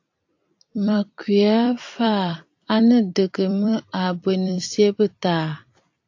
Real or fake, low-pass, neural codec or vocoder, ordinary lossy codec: fake; 7.2 kHz; vocoder, 44.1 kHz, 128 mel bands every 256 samples, BigVGAN v2; AAC, 48 kbps